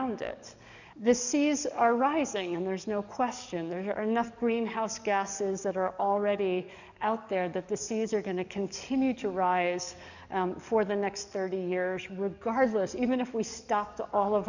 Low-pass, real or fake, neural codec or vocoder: 7.2 kHz; fake; codec, 44.1 kHz, 7.8 kbps, DAC